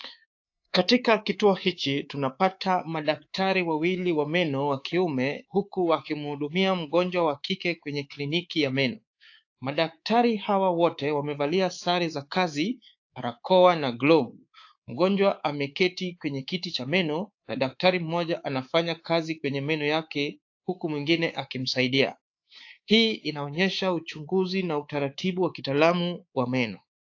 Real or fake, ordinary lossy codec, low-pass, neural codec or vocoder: fake; AAC, 48 kbps; 7.2 kHz; codec, 24 kHz, 3.1 kbps, DualCodec